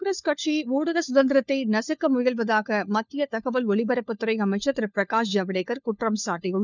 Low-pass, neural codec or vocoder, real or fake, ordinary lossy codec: 7.2 kHz; codec, 16 kHz, 4 kbps, FunCodec, trained on LibriTTS, 50 frames a second; fake; none